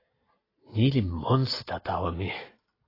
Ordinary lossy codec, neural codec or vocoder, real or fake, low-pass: AAC, 24 kbps; none; real; 5.4 kHz